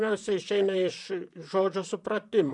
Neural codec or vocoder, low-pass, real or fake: vocoder, 44.1 kHz, 128 mel bands, Pupu-Vocoder; 10.8 kHz; fake